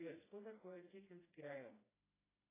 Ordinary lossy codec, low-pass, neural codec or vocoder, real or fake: AAC, 16 kbps; 3.6 kHz; codec, 16 kHz, 1 kbps, FreqCodec, smaller model; fake